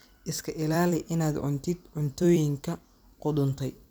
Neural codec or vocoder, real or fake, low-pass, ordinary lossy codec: vocoder, 44.1 kHz, 128 mel bands every 256 samples, BigVGAN v2; fake; none; none